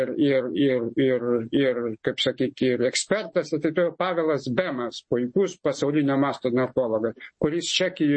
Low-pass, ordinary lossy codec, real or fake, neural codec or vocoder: 9.9 kHz; MP3, 32 kbps; real; none